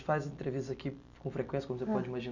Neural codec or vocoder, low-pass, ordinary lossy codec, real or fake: none; 7.2 kHz; none; real